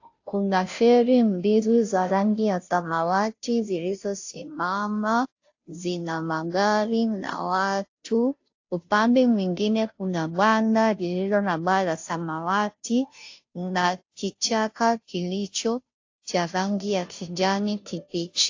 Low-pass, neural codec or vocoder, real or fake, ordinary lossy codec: 7.2 kHz; codec, 16 kHz, 0.5 kbps, FunCodec, trained on Chinese and English, 25 frames a second; fake; AAC, 48 kbps